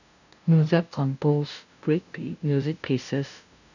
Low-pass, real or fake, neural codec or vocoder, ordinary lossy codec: 7.2 kHz; fake; codec, 16 kHz, 0.5 kbps, FunCodec, trained on LibriTTS, 25 frames a second; none